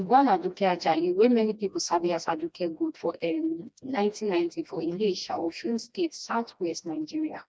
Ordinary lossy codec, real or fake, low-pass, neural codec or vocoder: none; fake; none; codec, 16 kHz, 1 kbps, FreqCodec, smaller model